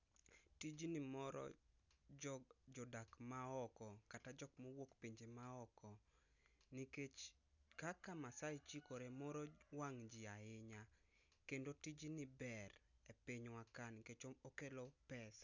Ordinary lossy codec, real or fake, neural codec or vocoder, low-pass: none; real; none; 7.2 kHz